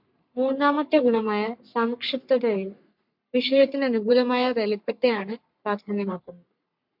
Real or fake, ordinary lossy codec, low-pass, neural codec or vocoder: fake; MP3, 48 kbps; 5.4 kHz; codec, 44.1 kHz, 3.4 kbps, Pupu-Codec